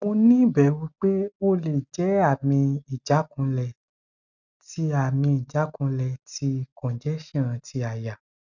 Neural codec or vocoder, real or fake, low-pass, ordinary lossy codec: none; real; 7.2 kHz; none